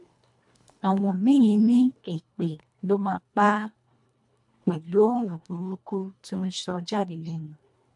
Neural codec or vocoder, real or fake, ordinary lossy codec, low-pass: codec, 24 kHz, 1.5 kbps, HILCodec; fake; MP3, 64 kbps; 10.8 kHz